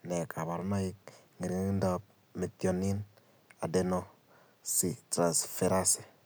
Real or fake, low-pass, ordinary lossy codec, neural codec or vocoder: real; none; none; none